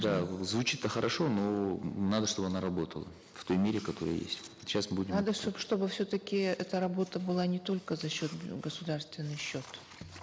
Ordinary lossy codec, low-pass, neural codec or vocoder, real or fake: none; none; none; real